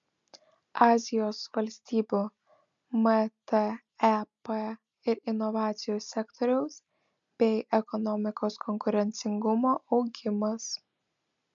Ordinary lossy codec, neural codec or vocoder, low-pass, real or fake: MP3, 64 kbps; none; 7.2 kHz; real